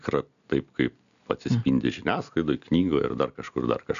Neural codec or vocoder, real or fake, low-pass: none; real; 7.2 kHz